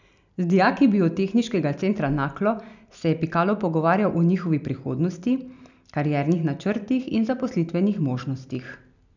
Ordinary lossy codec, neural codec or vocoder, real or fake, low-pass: none; none; real; 7.2 kHz